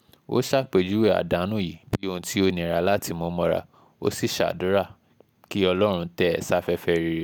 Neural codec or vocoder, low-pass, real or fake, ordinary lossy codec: none; none; real; none